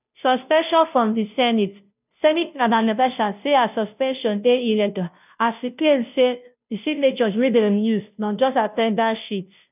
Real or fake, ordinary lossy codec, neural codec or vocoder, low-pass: fake; none; codec, 16 kHz, 0.5 kbps, FunCodec, trained on Chinese and English, 25 frames a second; 3.6 kHz